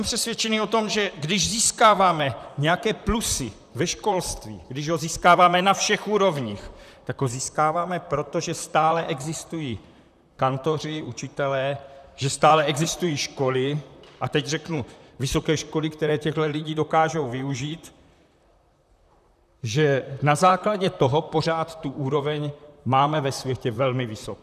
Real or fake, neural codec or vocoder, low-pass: fake; vocoder, 44.1 kHz, 128 mel bands, Pupu-Vocoder; 14.4 kHz